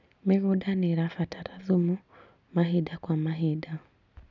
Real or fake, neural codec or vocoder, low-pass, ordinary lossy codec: real; none; 7.2 kHz; none